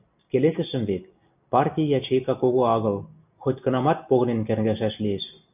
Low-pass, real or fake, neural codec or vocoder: 3.6 kHz; real; none